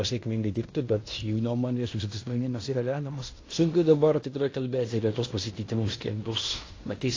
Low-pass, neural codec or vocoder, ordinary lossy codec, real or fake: 7.2 kHz; codec, 16 kHz in and 24 kHz out, 0.9 kbps, LongCat-Audio-Codec, fine tuned four codebook decoder; AAC, 32 kbps; fake